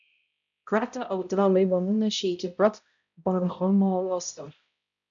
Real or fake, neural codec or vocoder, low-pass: fake; codec, 16 kHz, 0.5 kbps, X-Codec, HuBERT features, trained on balanced general audio; 7.2 kHz